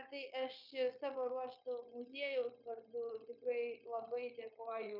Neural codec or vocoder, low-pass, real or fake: codec, 16 kHz, 0.9 kbps, LongCat-Audio-Codec; 5.4 kHz; fake